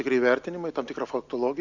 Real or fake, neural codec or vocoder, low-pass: real; none; 7.2 kHz